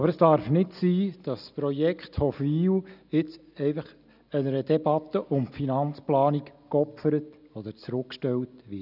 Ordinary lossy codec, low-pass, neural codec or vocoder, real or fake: none; 5.4 kHz; none; real